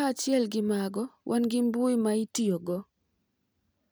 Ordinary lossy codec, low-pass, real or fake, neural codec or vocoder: none; none; real; none